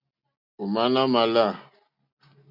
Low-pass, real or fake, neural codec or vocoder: 5.4 kHz; real; none